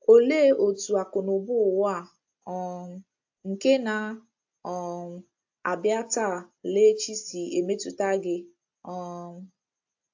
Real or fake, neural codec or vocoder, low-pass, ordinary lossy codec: real; none; 7.2 kHz; AAC, 48 kbps